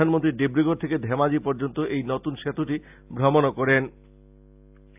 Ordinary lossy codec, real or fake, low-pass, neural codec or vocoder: AAC, 32 kbps; real; 3.6 kHz; none